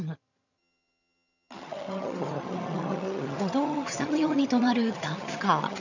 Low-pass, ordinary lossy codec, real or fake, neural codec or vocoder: 7.2 kHz; none; fake; vocoder, 22.05 kHz, 80 mel bands, HiFi-GAN